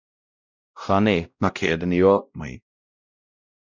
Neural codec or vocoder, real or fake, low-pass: codec, 16 kHz, 0.5 kbps, X-Codec, WavLM features, trained on Multilingual LibriSpeech; fake; 7.2 kHz